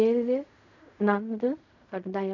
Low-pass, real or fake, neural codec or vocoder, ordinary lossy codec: 7.2 kHz; fake; codec, 16 kHz in and 24 kHz out, 0.4 kbps, LongCat-Audio-Codec, fine tuned four codebook decoder; none